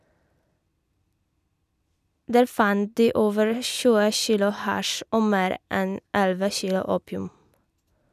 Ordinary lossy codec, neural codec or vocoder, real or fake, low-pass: none; none; real; 14.4 kHz